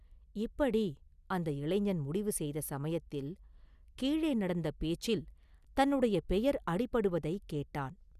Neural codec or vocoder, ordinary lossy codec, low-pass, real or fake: none; Opus, 64 kbps; 14.4 kHz; real